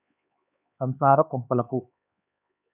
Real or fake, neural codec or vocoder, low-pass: fake; codec, 16 kHz, 4 kbps, X-Codec, HuBERT features, trained on LibriSpeech; 3.6 kHz